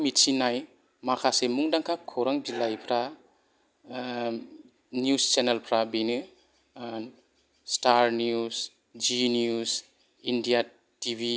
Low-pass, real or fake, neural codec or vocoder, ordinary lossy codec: none; real; none; none